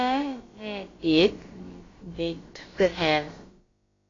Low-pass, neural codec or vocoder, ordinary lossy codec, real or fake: 7.2 kHz; codec, 16 kHz, about 1 kbps, DyCAST, with the encoder's durations; AAC, 32 kbps; fake